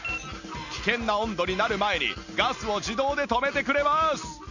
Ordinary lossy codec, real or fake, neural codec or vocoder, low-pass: none; real; none; 7.2 kHz